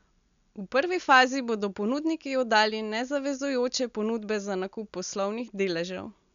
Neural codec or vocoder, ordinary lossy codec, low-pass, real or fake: none; none; 7.2 kHz; real